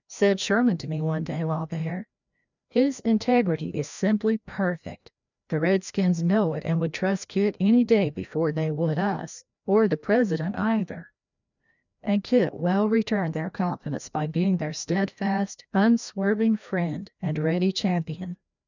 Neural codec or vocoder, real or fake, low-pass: codec, 16 kHz, 1 kbps, FreqCodec, larger model; fake; 7.2 kHz